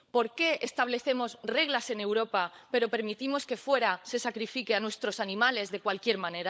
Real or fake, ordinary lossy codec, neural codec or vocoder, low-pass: fake; none; codec, 16 kHz, 16 kbps, FunCodec, trained on LibriTTS, 50 frames a second; none